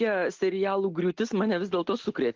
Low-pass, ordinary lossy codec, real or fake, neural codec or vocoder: 7.2 kHz; Opus, 16 kbps; real; none